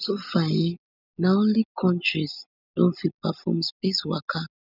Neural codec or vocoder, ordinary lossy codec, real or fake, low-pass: none; none; real; 5.4 kHz